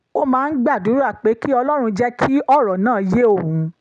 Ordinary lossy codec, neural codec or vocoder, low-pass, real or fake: none; none; 9.9 kHz; real